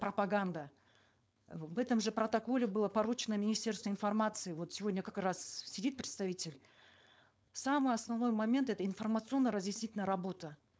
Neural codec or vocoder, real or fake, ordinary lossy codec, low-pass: codec, 16 kHz, 4.8 kbps, FACodec; fake; none; none